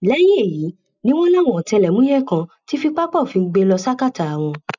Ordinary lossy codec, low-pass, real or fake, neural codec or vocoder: none; 7.2 kHz; real; none